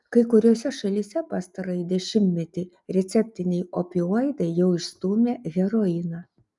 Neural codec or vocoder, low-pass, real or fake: none; 10.8 kHz; real